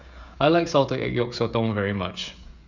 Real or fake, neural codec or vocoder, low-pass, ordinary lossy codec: fake; codec, 16 kHz, 16 kbps, FreqCodec, smaller model; 7.2 kHz; none